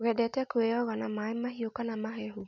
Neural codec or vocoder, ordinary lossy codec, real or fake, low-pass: none; none; real; 7.2 kHz